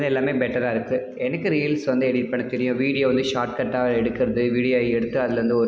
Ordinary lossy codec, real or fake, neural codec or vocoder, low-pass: none; real; none; none